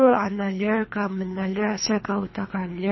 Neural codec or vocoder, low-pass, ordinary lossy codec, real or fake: codec, 24 kHz, 3 kbps, HILCodec; 7.2 kHz; MP3, 24 kbps; fake